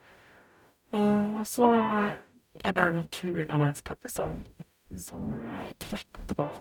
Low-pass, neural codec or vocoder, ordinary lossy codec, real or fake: 19.8 kHz; codec, 44.1 kHz, 0.9 kbps, DAC; none; fake